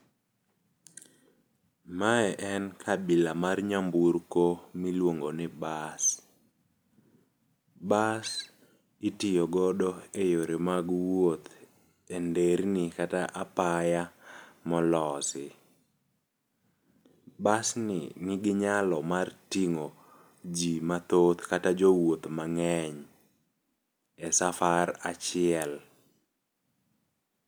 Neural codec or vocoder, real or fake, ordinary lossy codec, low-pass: none; real; none; none